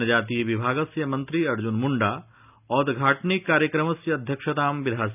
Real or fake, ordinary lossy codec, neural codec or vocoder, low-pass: real; none; none; 3.6 kHz